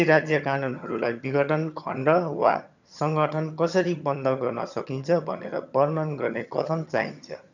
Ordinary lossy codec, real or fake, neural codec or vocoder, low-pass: none; fake; vocoder, 22.05 kHz, 80 mel bands, HiFi-GAN; 7.2 kHz